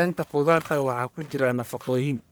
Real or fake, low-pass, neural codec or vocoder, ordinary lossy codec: fake; none; codec, 44.1 kHz, 1.7 kbps, Pupu-Codec; none